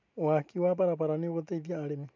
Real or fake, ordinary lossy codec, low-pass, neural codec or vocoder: real; MP3, 64 kbps; 7.2 kHz; none